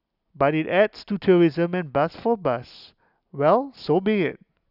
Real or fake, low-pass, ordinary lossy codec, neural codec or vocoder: real; 5.4 kHz; none; none